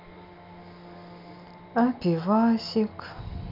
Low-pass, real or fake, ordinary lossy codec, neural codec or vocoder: 5.4 kHz; real; none; none